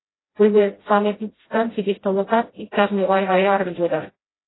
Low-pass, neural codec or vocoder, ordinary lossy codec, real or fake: 7.2 kHz; codec, 16 kHz, 0.5 kbps, FreqCodec, smaller model; AAC, 16 kbps; fake